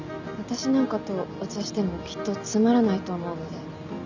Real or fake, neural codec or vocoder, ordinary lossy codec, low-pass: real; none; none; 7.2 kHz